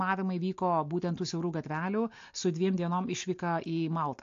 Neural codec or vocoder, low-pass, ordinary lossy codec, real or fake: none; 7.2 kHz; AAC, 48 kbps; real